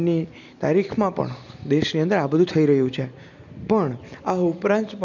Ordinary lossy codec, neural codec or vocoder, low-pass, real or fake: none; none; 7.2 kHz; real